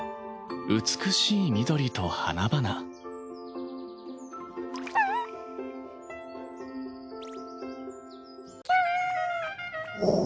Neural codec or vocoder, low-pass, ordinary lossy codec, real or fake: none; none; none; real